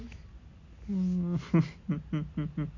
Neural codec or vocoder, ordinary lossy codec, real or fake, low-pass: none; none; real; 7.2 kHz